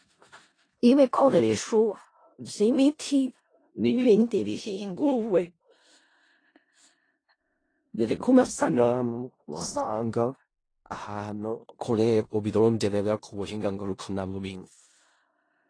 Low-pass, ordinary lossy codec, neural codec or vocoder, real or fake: 9.9 kHz; AAC, 32 kbps; codec, 16 kHz in and 24 kHz out, 0.4 kbps, LongCat-Audio-Codec, four codebook decoder; fake